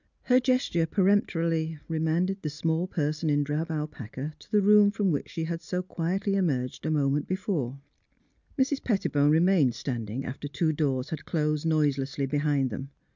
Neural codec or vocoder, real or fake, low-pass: none; real; 7.2 kHz